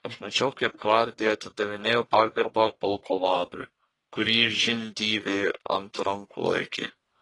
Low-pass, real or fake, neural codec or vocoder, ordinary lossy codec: 10.8 kHz; fake; codec, 44.1 kHz, 1.7 kbps, Pupu-Codec; AAC, 32 kbps